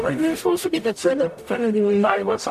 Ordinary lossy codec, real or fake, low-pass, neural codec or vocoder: AAC, 96 kbps; fake; 14.4 kHz; codec, 44.1 kHz, 0.9 kbps, DAC